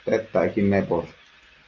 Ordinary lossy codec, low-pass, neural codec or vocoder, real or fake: Opus, 24 kbps; 7.2 kHz; none; real